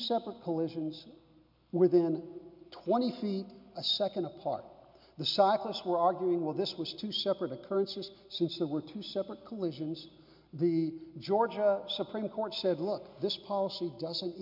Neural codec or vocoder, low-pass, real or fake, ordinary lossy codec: none; 5.4 kHz; real; MP3, 48 kbps